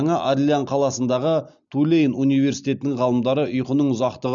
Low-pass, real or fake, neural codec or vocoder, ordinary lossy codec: 7.2 kHz; real; none; none